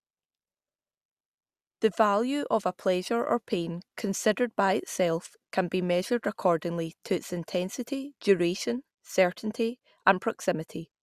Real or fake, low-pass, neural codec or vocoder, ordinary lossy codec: real; 14.4 kHz; none; Opus, 64 kbps